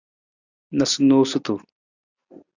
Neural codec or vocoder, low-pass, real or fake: none; 7.2 kHz; real